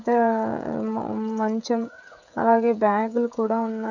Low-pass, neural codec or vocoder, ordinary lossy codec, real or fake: 7.2 kHz; codec, 16 kHz, 16 kbps, FreqCodec, smaller model; none; fake